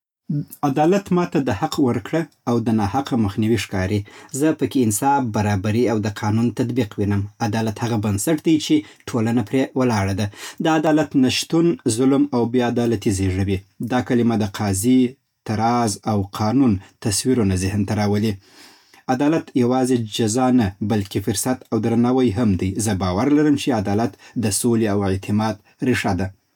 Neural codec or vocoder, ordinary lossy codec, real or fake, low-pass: none; none; real; 19.8 kHz